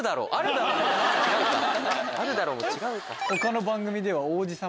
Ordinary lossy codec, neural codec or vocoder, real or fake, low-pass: none; none; real; none